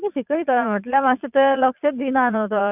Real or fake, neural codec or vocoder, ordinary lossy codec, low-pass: fake; vocoder, 22.05 kHz, 80 mel bands, Vocos; none; 3.6 kHz